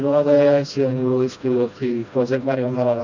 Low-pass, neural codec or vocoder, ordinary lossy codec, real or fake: 7.2 kHz; codec, 16 kHz, 1 kbps, FreqCodec, smaller model; none; fake